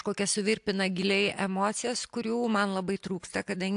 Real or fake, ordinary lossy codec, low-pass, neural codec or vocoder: real; AAC, 64 kbps; 10.8 kHz; none